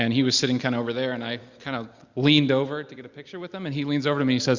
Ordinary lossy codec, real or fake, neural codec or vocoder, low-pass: Opus, 64 kbps; real; none; 7.2 kHz